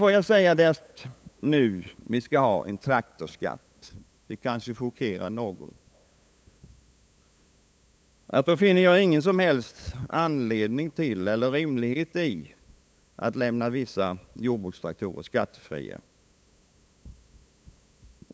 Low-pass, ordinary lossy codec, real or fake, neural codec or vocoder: none; none; fake; codec, 16 kHz, 8 kbps, FunCodec, trained on LibriTTS, 25 frames a second